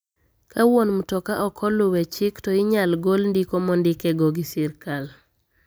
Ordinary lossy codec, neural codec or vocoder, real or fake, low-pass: none; none; real; none